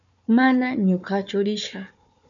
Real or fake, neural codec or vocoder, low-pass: fake; codec, 16 kHz, 4 kbps, FunCodec, trained on Chinese and English, 50 frames a second; 7.2 kHz